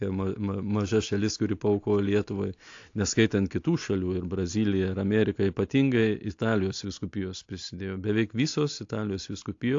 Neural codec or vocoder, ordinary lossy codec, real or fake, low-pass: none; AAC, 48 kbps; real; 7.2 kHz